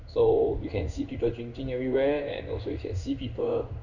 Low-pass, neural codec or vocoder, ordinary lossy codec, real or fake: 7.2 kHz; codec, 16 kHz in and 24 kHz out, 1 kbps, XY-Tokenizer; none; fake